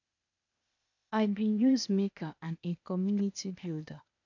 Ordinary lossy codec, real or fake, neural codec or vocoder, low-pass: none; fake; codec, 16 kHz, 0.8 kbps, ZipCodec; 7.2 kHz